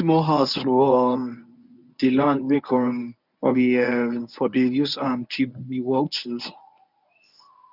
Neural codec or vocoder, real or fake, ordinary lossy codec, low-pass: codec, 24 kHz, 0.9 kbps, WavTokenizer, medium speech release version 1; fake; none; 5.4 kHz